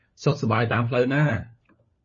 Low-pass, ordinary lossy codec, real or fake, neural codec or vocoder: 7.2 kHz; MP3, 32 kbps; fake; codec, 16 kHz, 4 kbps, FunCodec, trained on LibriTTS, 50 frames a second